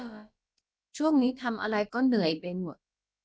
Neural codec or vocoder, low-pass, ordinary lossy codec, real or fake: codec, 16 kHz, about 1 kbps, DyCAST, with the encoder's durations; none; none; fake